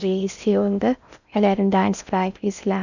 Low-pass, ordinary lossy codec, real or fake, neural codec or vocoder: 7.2 kHz; none; fake; codec, 16 kHz in and 24 kHz out, 0.6 kbps, FocalCodec, streaming, 4096 codes